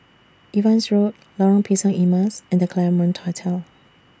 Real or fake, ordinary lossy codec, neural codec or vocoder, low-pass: real; none; none; none